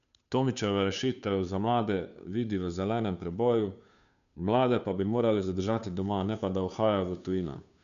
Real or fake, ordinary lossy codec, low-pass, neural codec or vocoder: fake; none; 7.2 kHz; codec, 16 kHz, 2 kbps, FunCodec, trained on Chinese and English, 25 frames a second